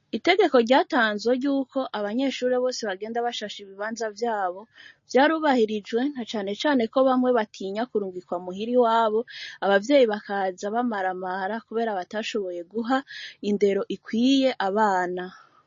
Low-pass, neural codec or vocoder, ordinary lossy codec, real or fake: 7.2 kHz; none; MP3, 32 kbps; real